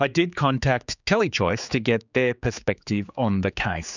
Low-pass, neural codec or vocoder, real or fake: 7.2 kHz; codec, 16 kHz, 4 kbps, X-Codec, HuBERT features, trained on general audio; fake